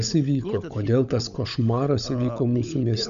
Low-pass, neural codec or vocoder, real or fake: 7.2 kHz; codec, 16 kHz, 16 kbps, FunCodec, trained on LibriTTS, 50 frames a second; fake